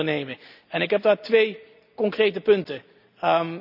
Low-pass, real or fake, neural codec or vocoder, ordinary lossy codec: 5.4 kHz; real; none; none